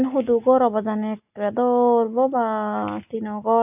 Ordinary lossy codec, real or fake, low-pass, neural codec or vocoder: AAC, 32 kbps; real; 3.6 kHz; none